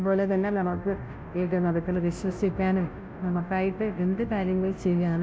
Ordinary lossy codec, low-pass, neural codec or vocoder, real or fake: none; none; codec, 16 kHz, 0.5 kbps, FunCodec, trained on Chinese and English, 25 frames a second; fake